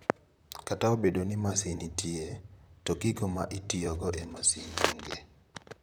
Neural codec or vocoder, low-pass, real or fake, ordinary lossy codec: vocoder, 44.1 kHz, 128 mel bands, Pupu-Vocoder; none; fake; none